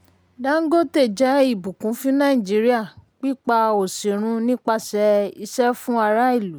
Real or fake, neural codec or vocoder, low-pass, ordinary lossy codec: real; none; none; none